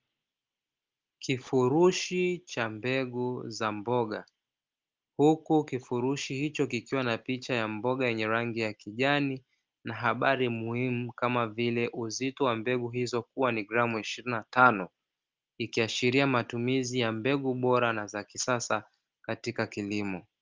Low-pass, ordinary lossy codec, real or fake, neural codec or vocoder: 7.2 kHz; Opus, 24 kbps; real; none